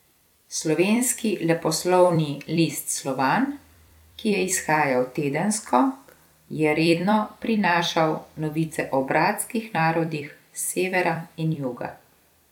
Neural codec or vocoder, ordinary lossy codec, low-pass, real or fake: vocoder, 44.1 kHz, 128 mel bands every 256 samples, BigVGAN v2; none; 19.8 kHz; fake